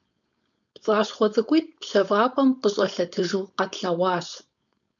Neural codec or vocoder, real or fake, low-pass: codec, 16 kHz, 4.8 kbps, FACodec; fake; 7.2 kHz